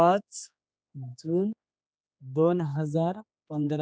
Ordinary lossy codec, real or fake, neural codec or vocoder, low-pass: none; fake; codec, 16 kHz, 2 kbps, X-Codec, HuBERT features, trained on general audio; none